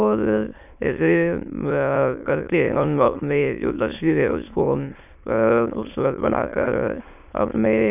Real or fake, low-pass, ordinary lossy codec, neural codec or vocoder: fake; 3.6 kHz; none; autoencoder, 22.05 kHz, a latent of 192 numbers a frame, VITS, trained on many speakers